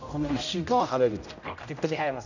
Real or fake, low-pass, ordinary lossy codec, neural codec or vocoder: fake; 7.2 kHz; none; codec, 16 kHz, 0.5 kbps, X-Codec, HuBERT features, trained on general audio